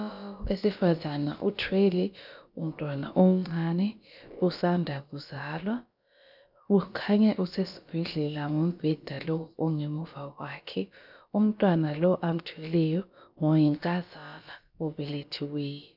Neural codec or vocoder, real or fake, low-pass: codec, 16 kHz, about 1 kbps, DyCAST, with the encoder's durations; fake; 5.4 kHz